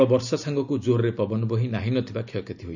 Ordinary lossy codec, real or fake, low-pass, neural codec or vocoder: none; real; 7.2 kHz; none